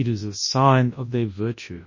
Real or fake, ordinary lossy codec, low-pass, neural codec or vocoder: fake; MP3, 32 kbps; 7.2 kHz; codec, 24 kHz, 0.9 kbps, WavTokenizer, large speech release